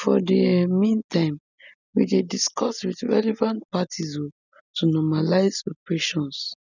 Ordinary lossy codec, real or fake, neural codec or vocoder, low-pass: none; real; none; 7.2 kHz